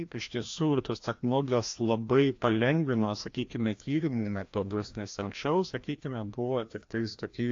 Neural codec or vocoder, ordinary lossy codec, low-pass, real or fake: codec, 16 kHz, 1 kbps, FreqCodec, larger model; AAC, 48 kbps; 7.2 kHz; fake